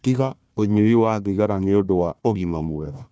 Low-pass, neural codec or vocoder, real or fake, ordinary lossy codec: none; codec, 16 kHz, 1 kbps, FunCodec, trained on Chinese and English, 50 frames a second; fake; none